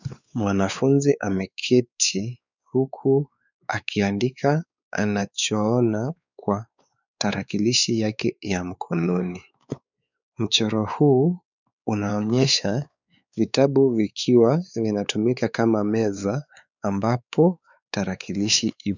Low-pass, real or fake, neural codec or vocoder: 7.2 kHz; fake; codec, 16 kHz, 4 kbps, X-Codec, WavLM features, trained on Multilingual LibriSpeech